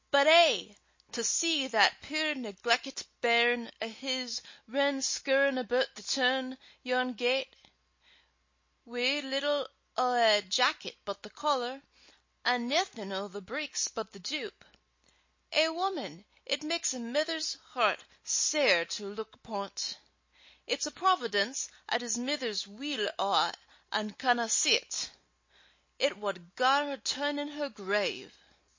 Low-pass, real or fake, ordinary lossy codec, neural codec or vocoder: 7.2 kHz; real; MP3, 32 kbps; none